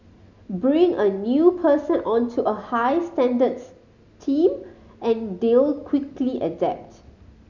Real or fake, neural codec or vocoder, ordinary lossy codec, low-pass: real; none; none; 7.2 kHz